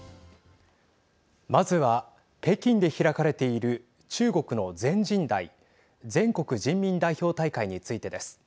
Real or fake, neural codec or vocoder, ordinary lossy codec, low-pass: real; none; none; none